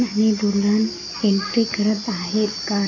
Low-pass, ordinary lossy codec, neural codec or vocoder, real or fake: 7.2 kHz; AAC, 32 kbps; none; real